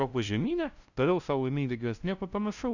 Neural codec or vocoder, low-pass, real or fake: codec, 16 kHz, 0.5 kbps, FunCodec, trained on LibriTTS, 25 frames a second; 7.2 kHz; fake